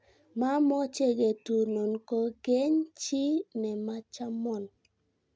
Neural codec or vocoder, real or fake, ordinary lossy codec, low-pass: none; real; none; none